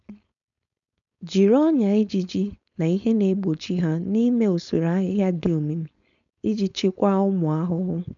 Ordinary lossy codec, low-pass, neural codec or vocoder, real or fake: none; 7.2 kHz; codec, 16 kHz, 4.8 kbps, FACodec; fake